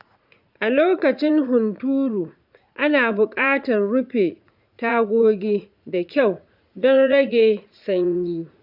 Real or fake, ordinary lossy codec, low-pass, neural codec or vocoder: fake; none; 5.4 kHz; vocoder, 44.1 kHz, 80 mel bands, Vocos